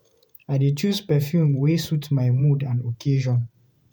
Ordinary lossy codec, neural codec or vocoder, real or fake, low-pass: none; vocoder, 48 kHz, 128 mel bands, Vocos; fake; none